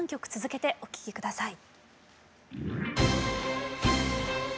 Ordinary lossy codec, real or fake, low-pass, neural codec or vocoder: none; real; none; none